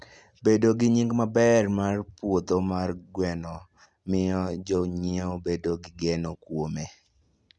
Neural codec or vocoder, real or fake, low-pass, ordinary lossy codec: none; real; none; none